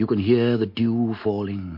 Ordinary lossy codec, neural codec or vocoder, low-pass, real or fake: MP3, 32 kbps; none; 5.4 kHz; real